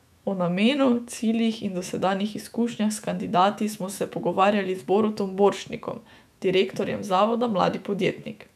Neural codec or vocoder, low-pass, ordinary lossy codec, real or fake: autoencoder, 48 kHz, 128 numbers a frame, DAC-VAE, trained on Japanese speech; 14.4 kHz; none; fake